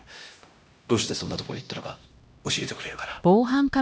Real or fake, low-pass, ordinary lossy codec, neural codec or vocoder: fake; none; none; codec, 16 kHz, 1 kbps, X-Codec, HuBERT features, trained on LibriSpeech